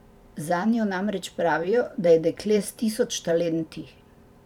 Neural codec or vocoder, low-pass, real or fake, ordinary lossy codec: vocoder, 44.1 kHz, 128 mel bands every 512 samples, BigVGAN v2; 19.8 kHz; fake; none